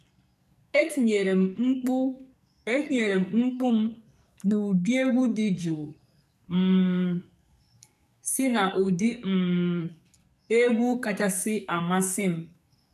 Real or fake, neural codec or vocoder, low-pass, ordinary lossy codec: fake; codec, 44.1 kHz, 2.6 kbps, SNAC; 14.4 kHz; none